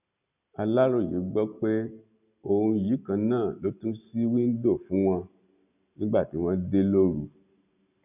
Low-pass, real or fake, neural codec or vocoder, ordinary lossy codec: 3.6 kHz; real; none; none